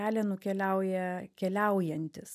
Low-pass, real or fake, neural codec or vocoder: 14.4 kHz; real; none